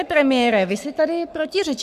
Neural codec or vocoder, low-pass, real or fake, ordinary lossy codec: codec, 44.1 kHz, 7.8 kbps, Pupu-Codec; 14.4 kHz; fake; MP3, 96 kbps